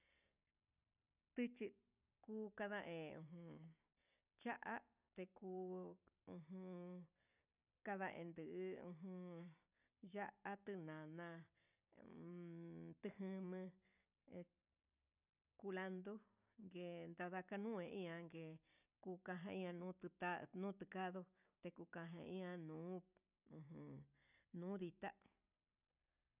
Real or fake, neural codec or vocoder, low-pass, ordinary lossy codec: real; none; 3.6 kHz; none